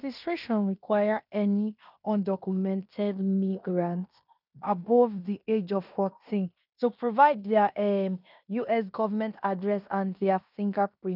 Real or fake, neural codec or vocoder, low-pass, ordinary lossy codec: fake; codec, 16 kHz in and 24 kHz out, 0.9 kbps, LongCat-Audio-Codec, fine tuned four codebook decoder; 5.4 kHz; none